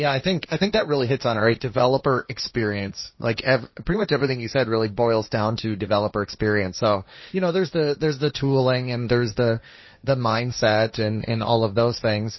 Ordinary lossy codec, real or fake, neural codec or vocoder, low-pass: MP3, 24 kbps; fake; codec, 16 kHz, 1.1 kbps, Voila-Tokenizer; 7.2 kHz